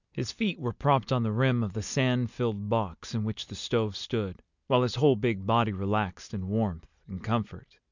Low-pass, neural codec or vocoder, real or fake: 7.2 kHz; none; real